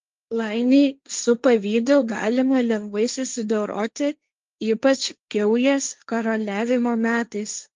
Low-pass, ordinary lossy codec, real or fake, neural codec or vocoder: 7.2 kHz; Opus, 32 kbps; fake; codec, 16 kHz, 1.1 kbps, Voila-Tokenizer